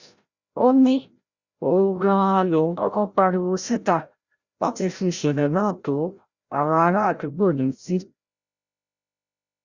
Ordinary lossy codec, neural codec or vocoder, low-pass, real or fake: Opus, 64 kbps; codec, 16 kHz, 0.5 kbps, FreqCodec, larger model; 7.2 kHz; fake